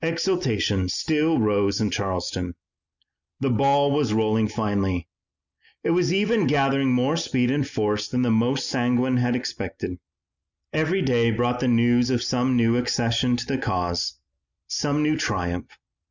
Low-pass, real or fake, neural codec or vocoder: 7.2 kHz; real; none